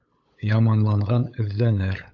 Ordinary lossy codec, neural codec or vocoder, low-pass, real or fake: AAC, 48 kbps; codec, 16 kHz, 8 kbps, FunCodec, trained on LibriTTS, 25 frames a second; 7.2 kHz; fake